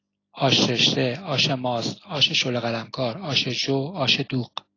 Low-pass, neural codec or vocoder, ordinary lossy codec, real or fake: 7.2 kHz; none; AAC, 32 kbps; real